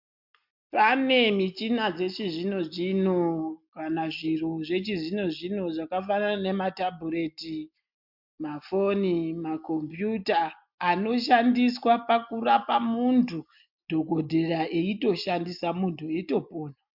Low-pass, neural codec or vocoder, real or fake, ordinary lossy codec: 5.4 kHz; none; real; MP3, 48 kbps